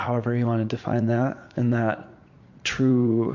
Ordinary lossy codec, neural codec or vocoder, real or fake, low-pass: MP3, 48 kbps; vocoder, 44.1 kHz, 80 mel bands, Vocos; fake; 7.2 kHz